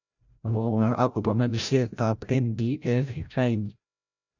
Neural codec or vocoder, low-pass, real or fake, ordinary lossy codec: codec, 16 kHz, 0.5 kbps, FreqCodec, larger model; 7.2 kHz; fake; none